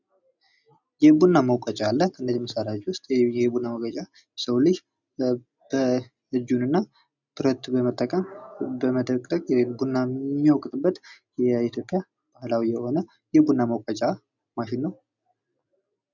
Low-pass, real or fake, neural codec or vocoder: 7.2 kHz; real; none